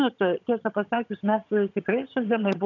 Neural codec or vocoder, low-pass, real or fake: vocoder, 22.05 kHz, 80 mel bands, HiFi-GAN; 7.2 kHz; fake